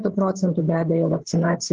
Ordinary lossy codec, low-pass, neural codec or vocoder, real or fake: Opus, 16 kbps; 7.2 kHz; codec, 16 kHz, 8 kbps, FreqCodec, larger model; fake